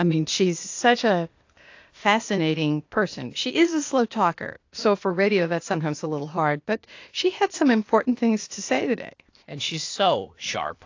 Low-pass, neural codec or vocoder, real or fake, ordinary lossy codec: 7.2 kHz; codec, 16 kHz, 0.8 kbps, ZipCodec; fake; AAC, 48 kbps